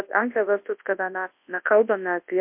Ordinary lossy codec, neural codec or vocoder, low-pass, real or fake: MP3, 32 kbps; codec, 24 kHz, 0.9 kbps, WavTokenizer, large speech release; 3.6 kHz; fake